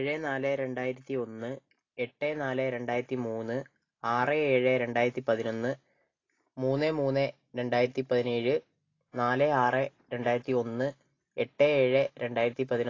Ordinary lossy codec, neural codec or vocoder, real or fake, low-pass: AAC, 32 kbps; none; real; 7.2 kHz